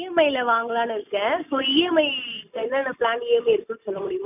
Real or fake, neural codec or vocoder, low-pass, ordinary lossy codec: real; none; 3.6 kHz; none